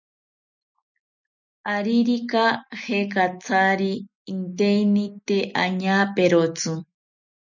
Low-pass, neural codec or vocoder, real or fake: 7.2 kHz; none; real